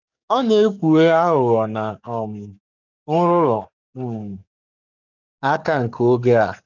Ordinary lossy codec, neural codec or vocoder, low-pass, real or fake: none; codec, 44.1 kHz, 7.8 kbps, DAC; 7.2 kHz; fake